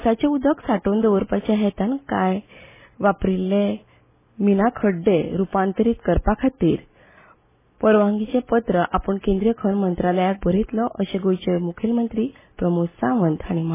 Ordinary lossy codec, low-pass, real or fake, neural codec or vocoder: MP3, 16 kbps; 3.6 kHz; real; none